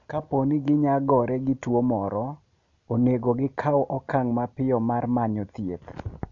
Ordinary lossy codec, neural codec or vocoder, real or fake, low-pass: none; none; real; 7.2 kHz